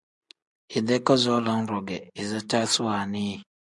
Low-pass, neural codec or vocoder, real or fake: 10.8 kHz; none; real